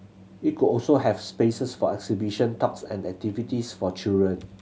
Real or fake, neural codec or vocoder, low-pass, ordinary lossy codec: real; none; none; none